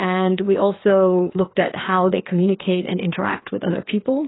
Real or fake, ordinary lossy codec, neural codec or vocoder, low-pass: fake; AAC, 16 kbps; codec, 16 kHz, 2 kbps, FreqCodec, larger model; 7.2 kHz